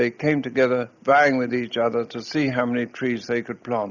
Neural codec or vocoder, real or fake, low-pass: none; real; 7.2 kHz